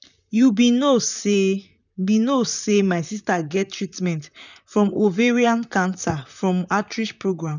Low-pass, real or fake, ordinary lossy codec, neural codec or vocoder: 7.2 kHz; fake; none; vocoder, 44.1 kHz, 128 mel bands, Pupu-Vocoder